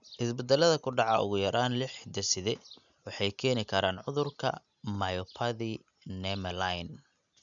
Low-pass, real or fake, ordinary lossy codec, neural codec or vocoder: 7.2 kHz; real; none; none